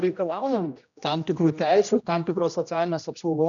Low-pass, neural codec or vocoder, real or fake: 7.2 kHz; codec, 16 kHz, 1 kbps, X-Codec, HuBERT features, trained on general audio; fake